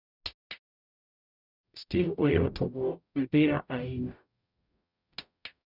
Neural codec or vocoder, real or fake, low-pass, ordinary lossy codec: codec, 44.1 kHz, 0.9 kbps, DAC; fake; 5.4 kHz; none